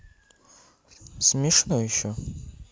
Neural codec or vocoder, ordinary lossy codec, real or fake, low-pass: none; none; real; none